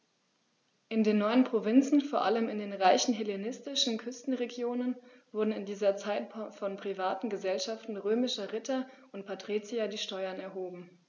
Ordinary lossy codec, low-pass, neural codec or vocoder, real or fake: none; 7.2 kHz; none; real